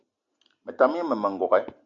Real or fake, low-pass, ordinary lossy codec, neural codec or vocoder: real; 7.2 kHz; MP3, 64 kbps; none